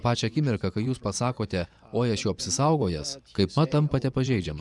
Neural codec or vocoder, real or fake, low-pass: vocoder, 24 kHz, 100 mel bands, Vocos; fake; 10.8 kHz